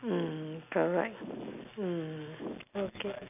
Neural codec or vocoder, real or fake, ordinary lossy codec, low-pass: none; real; none; 3.6 kHz